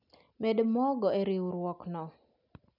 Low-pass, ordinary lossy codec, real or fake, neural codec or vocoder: 5.4 kHz; none; real; none